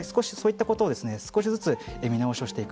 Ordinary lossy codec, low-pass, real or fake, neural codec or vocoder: none; none; real; none